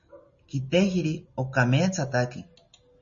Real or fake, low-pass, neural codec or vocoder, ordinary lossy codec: real; 7.2 kHz; none; MP3, 32 kbps